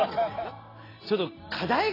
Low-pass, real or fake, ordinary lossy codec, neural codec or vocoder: 5.4 kHz; real; AAC, 24 kbps; none